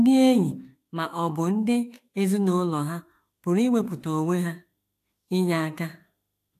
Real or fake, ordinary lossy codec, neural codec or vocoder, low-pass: fake; AAC, 64 kbps; autoencoder, 48 kHz, 32 numbers a frame, DAC-VAE, trained on Japanese speech; 14.4 kHz